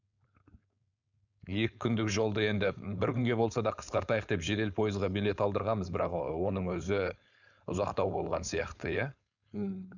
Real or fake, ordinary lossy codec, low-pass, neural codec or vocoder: fake; none; 7.2 kHz; codec, 16 kHz, 4.8 kbps, FACodec